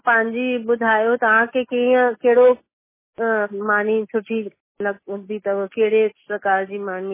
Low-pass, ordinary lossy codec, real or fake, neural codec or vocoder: 3.6 kHz; MP3, 16 kbps; real; none